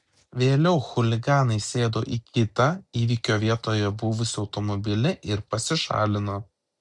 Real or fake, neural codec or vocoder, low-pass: real; none; 10.8 kHz